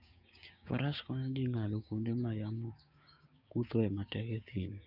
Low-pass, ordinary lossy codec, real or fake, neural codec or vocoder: 5.4 kHz; none; fake; codec, 16 kHz, 6 kbps, DAC